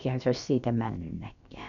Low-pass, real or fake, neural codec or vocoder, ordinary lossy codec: 7.2 kHz; fake; codec, 16 kHz, about 1 kbps, DyCAST, with the encoder's durations; none